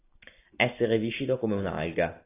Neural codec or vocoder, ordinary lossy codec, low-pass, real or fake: none; AAC, 24 kbps; 3.6 kHz; real